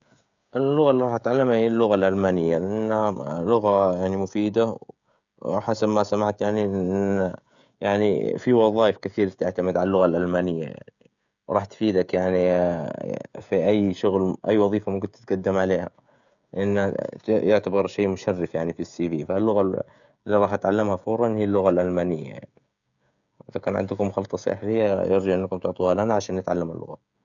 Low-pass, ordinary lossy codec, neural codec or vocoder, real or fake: 7.2 kHz; none; codec, 16 kHz, 16 kbps, FreqCodec, smaller model; fake